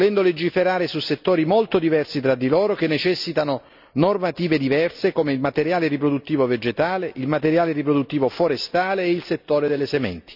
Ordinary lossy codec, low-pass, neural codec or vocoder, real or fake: none; 5.4 kHz; none; real